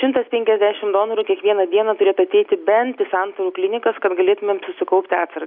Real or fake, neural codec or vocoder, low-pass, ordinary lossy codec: real; none; 5.4 kHz; AAC, 48 kbps